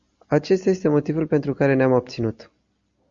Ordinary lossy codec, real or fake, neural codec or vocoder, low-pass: Opus, 64 kbps; real; none; 7.2 kHz